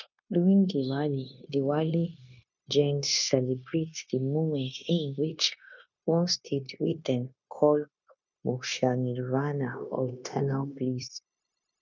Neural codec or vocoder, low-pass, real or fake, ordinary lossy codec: codec, 16 kHz, 0.9 kbps, LongCat-Audio-Codec; 7.2 kHz; fake; none